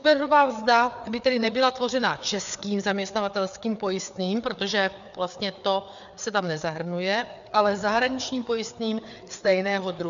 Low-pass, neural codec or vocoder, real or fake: 7.2 kHz; codec, 16 kHz, 4 kbps, FreqCodec, larger model; fake